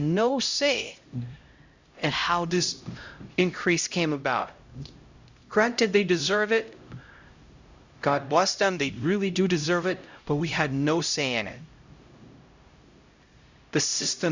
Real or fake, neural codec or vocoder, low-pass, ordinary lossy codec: fake; codec, 16 kHz, 0.5 kbps, X-Codec, HuBERT features, trained on LibriSpeech; 7.2 kHz; Opus, 64 kbps